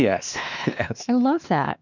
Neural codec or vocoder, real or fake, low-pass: codec, 16 kHz, 2 kbps, X-Codec, WavLM features, trained on Multilingual LibriSpeech; fake; 7.2 kHz